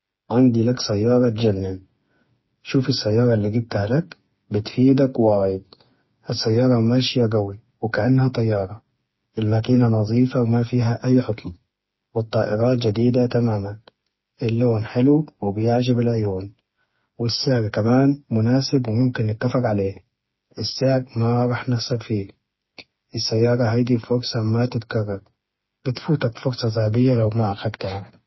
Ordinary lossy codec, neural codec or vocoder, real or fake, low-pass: MP3, 24 kbps; codec, 16 kHz, 4 kbps, FreqCodec, smaller model; fake; 7.2 kHz